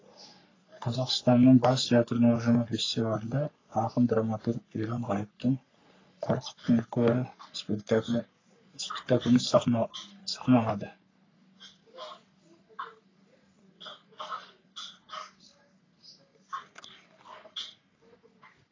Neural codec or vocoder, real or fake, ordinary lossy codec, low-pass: codec, 44.1 kHz, 3.4 kbps, Pupu-Codec; fake; AAC, 32 kbps; 7.2 kHz